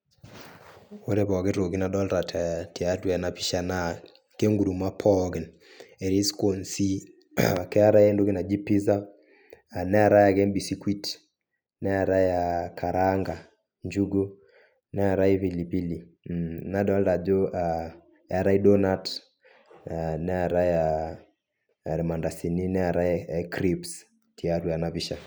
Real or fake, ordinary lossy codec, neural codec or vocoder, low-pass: real; none; none; none